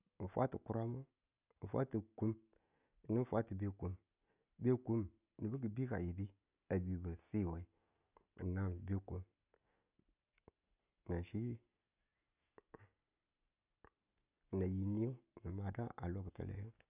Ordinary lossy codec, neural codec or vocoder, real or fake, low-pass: none; none; real; 3.6 kHz